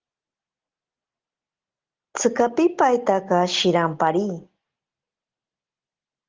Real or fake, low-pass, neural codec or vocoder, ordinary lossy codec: real; 7.2 kHz; none; Opus, 16 kbps